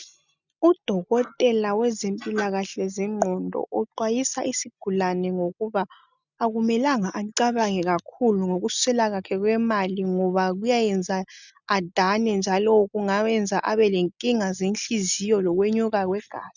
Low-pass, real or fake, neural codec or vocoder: 7.2 kHz; real; none